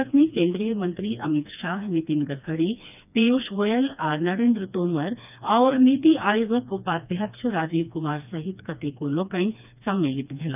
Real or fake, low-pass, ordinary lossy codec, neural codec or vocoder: fake; 3.6 kHz; none; codec, 16 kHz, 2 kbps, FreqCodec, smaller model